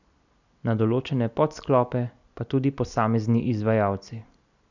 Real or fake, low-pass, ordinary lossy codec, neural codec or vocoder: real; 7.2 kHz; none; none